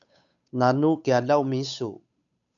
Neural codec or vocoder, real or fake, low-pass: codec, 16 kHz, 8 kbps, FunCodec, trained on Chinese and English, 25 frames a second; fake; 7.2 kHz